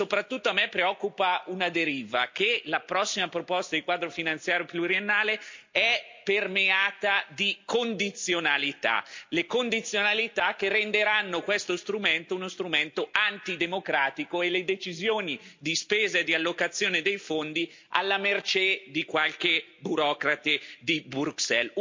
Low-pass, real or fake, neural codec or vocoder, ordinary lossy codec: 7.2 kHz; real; none; MP3, 64 kbps